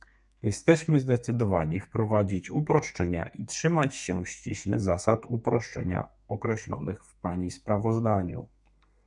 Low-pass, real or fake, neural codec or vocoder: 10.8 kHz; fake; codec, 32 kHz, 1.9 kbps, SNAC